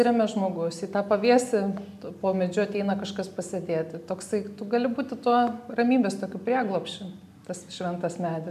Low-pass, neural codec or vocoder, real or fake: 14.4 kHz; none; real